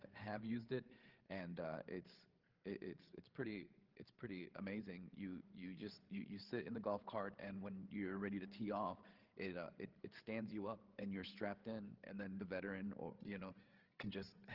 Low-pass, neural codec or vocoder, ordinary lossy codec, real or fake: 5.4 kHz; codec, 16 kHz, 8 kbps, FreqCodec, larger model; Opus, 16 kbps; fake